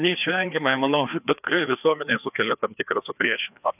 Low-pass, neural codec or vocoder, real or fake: 3.6 kHz; codec, 16 kHz, 2 kbps, FreqCodec, larger model; fake